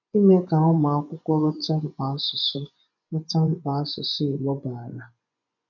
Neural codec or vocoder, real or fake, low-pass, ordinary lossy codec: vocoder, 24 kHz, 100 mel bands, Vocos; fake; 7.2 kHz; none